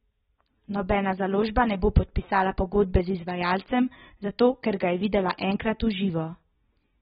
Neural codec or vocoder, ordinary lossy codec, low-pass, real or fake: none; AAC, 16 kbps; 19.8 kHz; real